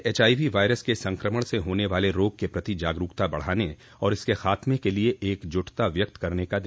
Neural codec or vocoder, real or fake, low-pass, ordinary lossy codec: none; real; none; none